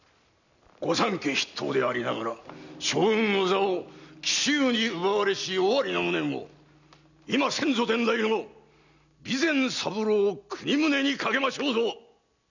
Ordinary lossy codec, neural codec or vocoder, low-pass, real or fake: none; none; 7.2 kHz; real